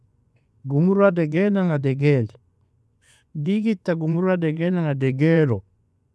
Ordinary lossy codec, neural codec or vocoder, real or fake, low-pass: none; vocoder, 24 kHz, 100 mel bands, Vocos; fake; none